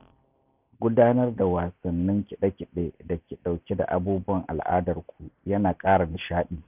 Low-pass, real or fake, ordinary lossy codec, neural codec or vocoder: 3.6 kHz; real; none; none